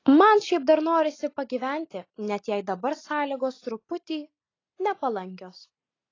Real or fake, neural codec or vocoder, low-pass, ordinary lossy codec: real; none; 7.2 kHz; AAC, 32 kbps